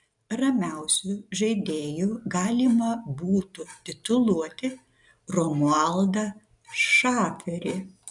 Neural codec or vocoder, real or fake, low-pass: none; real; 10.8 kHz